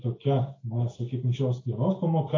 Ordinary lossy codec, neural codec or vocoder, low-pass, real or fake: AAC, 32 kbps; none; 7.2 kHz; real